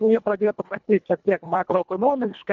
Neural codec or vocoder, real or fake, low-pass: codec, 24 kHz, 1.5 kbps, HILCodec; fake; 7.2 kHz